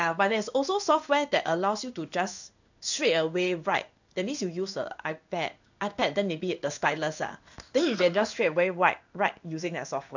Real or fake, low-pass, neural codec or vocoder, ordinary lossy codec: fake; 7.2 kHz; codec, 16 kHz in and 24 kHz out, 1 kbps, XY-Tokenizer; none